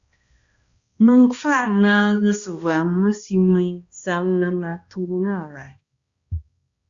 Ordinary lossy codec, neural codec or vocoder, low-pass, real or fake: Opus, 64 kbps; codec, 16 kHz, 1 kbps, X-Codec, HuBERT features, trained on balanced general audio; 7.2 kHz; fake